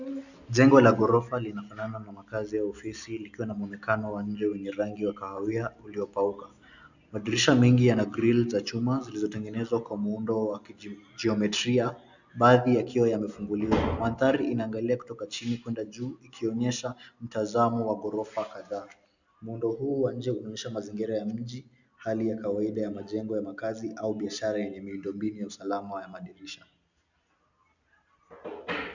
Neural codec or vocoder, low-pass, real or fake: none; 7.2 kHz; real